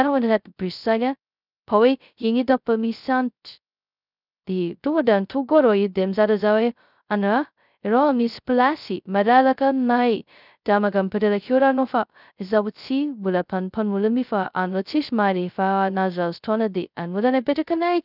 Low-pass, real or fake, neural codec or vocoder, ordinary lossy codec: 5.4 kHz; fake; codec, 16 kHz, 0.2 kbps, FocalCodec; none